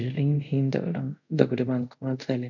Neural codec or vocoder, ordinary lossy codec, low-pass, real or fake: codec, 24 kHz, 0.5 kbps, DualCodec; none; 7.2 kHz; fake